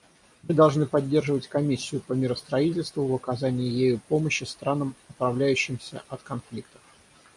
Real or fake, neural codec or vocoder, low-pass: real; none; 10.8 kHz